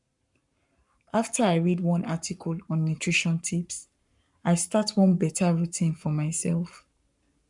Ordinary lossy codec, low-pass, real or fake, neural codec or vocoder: none; 10.8 kHz; fake; codec, 44.1 kHz, 7.8 kbps, Pupu-Codec